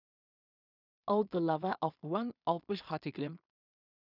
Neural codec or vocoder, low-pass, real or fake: codec, 16 kHz in and 24 kHz out, 0.4 kbps, LongCat-Audio-Codec, two codebook decoder; 5.4 kHz; fake